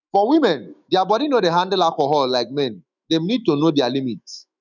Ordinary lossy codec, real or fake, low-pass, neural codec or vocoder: none; fake; 7.2 kHz; autoencoder, 48 kHz, 128 numbers a frame, DAC-VAE, trained on Japanese speech